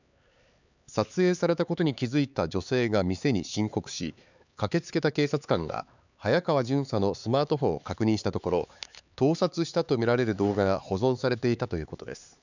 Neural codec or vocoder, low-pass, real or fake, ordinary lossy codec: codec, 16 kHz, 4 kbps, X-Codec, HuBERT features, trained on LibriSpeech; 7.2 kHz; fake; none